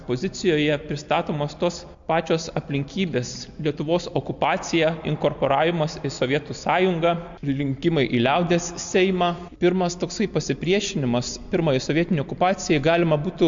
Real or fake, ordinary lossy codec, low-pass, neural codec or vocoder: real; MP3, 64 kbps; 7.2 kHz; none